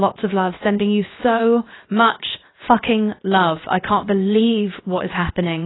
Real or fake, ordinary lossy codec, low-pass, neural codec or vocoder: fake; AAC, 16 kbps; 7.2 kHz; codec, 16 kHz, 0.7 kbps, FocalCodec